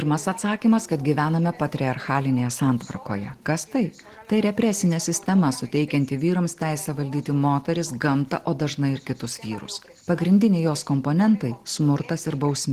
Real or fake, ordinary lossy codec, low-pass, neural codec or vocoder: real; Opus, 16 kbps; 14.4 kHz; none